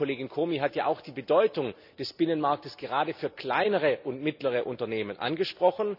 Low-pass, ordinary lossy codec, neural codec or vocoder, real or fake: 5.4 kHz; none; none; real